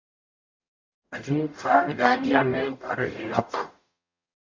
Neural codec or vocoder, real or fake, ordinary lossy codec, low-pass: codec, 44.1 kHz, 0.9 kbps, DAC; fake; MP3, 48 kbps; 7.2 kHz